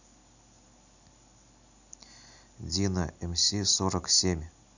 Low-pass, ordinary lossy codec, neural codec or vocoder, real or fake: 7.2 kHz; none; none; real